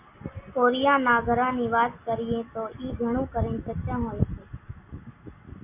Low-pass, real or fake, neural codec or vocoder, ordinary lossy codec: 3.6 kHz; real; none; MP3, 24 kbps